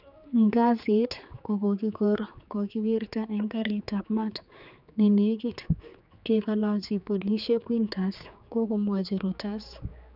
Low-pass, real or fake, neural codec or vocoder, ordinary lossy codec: 5.4 kHz; fake; codec, 16 kHz, 4 kbps, X-Codec, HuBERT features, trained on general audio; none